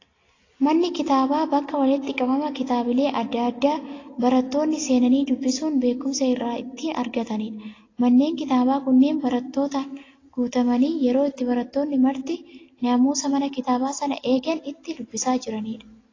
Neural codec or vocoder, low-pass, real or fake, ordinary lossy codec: none; 7.2 kHz; real; AAC, 32 kbps